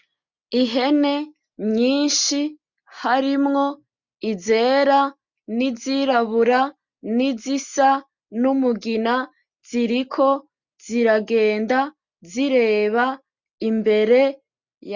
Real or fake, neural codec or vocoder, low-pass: real; none; 7.2 kHz